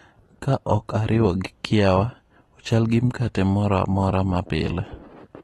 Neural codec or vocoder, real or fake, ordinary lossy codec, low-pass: none; real; AAC, 32 kbps; 14.4 kHz